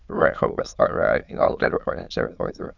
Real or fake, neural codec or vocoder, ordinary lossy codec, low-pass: fake; autoencoder, 22.05 kHz, a latent of 192 numbers a frame, VITS, trained on many speakers; none; 7.2 kHz